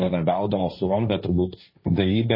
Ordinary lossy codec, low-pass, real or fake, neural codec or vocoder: MP3, 24 kbps; 5.4 kHz; fake; codec, 16 kHz, 1.1 kbps, Voila-Tokenizer